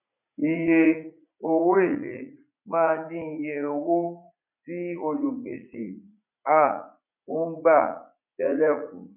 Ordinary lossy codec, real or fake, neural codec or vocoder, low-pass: none; fake; vocoder, 44.1 kHz, 80 mel bands, Vocos; 3.6 kHz